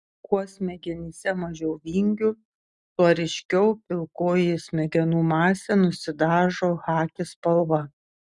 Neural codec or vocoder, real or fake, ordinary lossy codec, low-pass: none; real; Opus, 64 kbps; 10.8 kHz